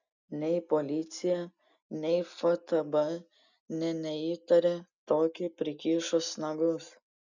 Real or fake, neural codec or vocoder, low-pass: real; none; 7.2 kHz